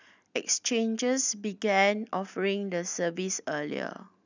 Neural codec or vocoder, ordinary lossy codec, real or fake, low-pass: none; none; real; 7.2 kHz